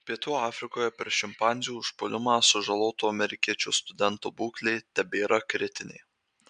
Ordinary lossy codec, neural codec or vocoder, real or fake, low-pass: MP3, 64 kbps; none; real; 9.9 kHz